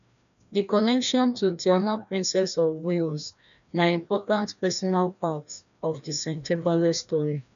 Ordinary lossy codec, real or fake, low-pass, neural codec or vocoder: none; fake; 7.2 kHz; codec, 16 kHz, 1 kbps, FreqCodec, larger model